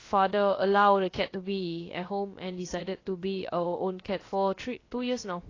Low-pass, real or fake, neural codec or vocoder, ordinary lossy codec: 7.2 kHz; fake; codec, 16 kHz, about 1 kbps, DyCAST, with the encoder's durations; AAC, 32 kbps